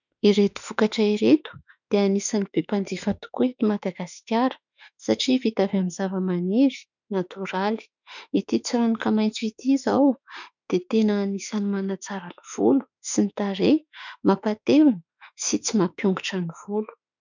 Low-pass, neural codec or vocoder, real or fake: 7.2 kHz; autoencoder, 48 kHz, 32 numbers a frame, DAC-VAE, trained on Japanese speech; fake